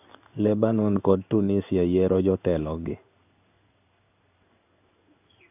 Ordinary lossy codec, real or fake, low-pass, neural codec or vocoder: none; fake; 3.6 kHz; codec, 16 kHz in and 24 kHz out, 1 kbps, XY-Tokenizer